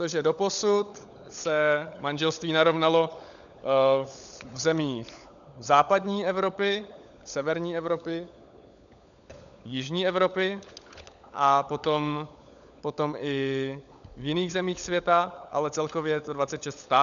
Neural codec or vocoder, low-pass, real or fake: codec, 16 kHz, 16 kbps, FunCodec, trained on LibriTTS, 50 frames a second; 7.2 kHz; fake